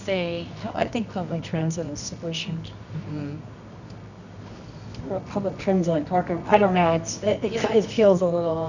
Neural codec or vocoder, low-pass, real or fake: codec, 24 kHz, 0.9 kbps, WavTokenizer, medium music audio release; 7.2 kHz; fake